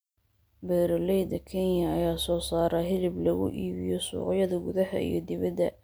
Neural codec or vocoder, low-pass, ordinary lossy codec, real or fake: none; none; none; real